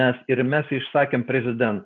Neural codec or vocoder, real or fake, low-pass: none; real; 7.2 kHz